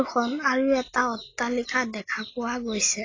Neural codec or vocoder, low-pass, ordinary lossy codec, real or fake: none; 7.2 kHz; AAC, 32 kbps; real